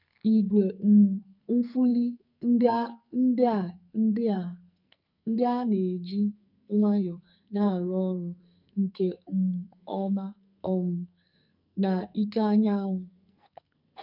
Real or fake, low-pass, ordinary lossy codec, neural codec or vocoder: fake; 5.4 kHz; none; codec, 44.1 kHz, 2.6 kbps, SNAC